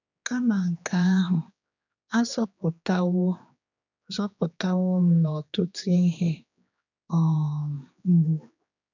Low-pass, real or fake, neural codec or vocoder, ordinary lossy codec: 7.2 kHz; fake; codec, 16 kHz, 2 kbps, X-Codec, HuBERT features, trained on general audio; none